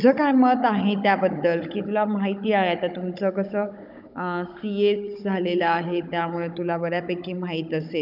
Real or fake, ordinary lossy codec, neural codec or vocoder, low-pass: fake; none; codec, 16 kHz, 16 kbps, FunCodec, trained on Chinese and English, 50 frames a second; 5.4 kHz